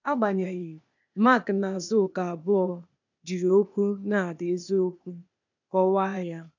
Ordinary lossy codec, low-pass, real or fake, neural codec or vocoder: none; 7.2 kHz; fake; codec, 16 kHz, 0.8 kbps, ZipCodec